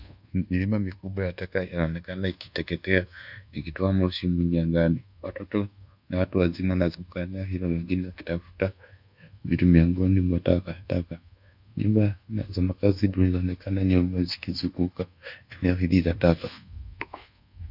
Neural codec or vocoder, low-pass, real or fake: codec, 24 kHz, 1.2 kbps, DualCodec; 5.4 kHz; fake